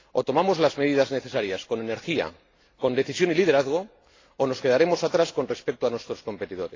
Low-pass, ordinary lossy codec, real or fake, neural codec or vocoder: 7.2 kHz; AAC, 32 kbps; real; none